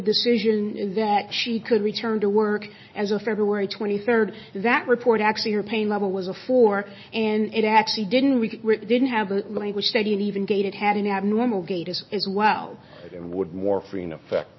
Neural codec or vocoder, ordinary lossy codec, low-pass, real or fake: none; MP3, 24 kbps; 7.2 kHz; real